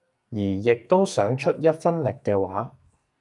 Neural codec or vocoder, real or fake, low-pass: codec, 44.1 kHz, 2.6 kbps, SNAC; fake; 10.8 kHz